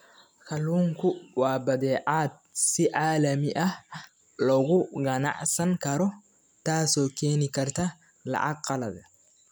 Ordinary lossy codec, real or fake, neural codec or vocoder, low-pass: none; real; none; none